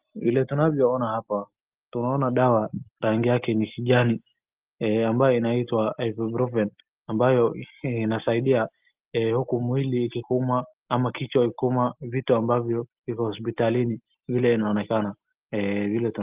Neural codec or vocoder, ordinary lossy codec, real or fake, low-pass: none; Opus, 32 kbps; real; 3.6 kHz